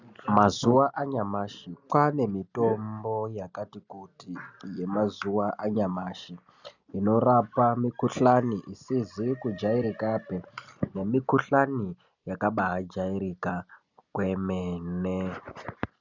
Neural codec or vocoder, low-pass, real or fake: none; 7.2 kHz; real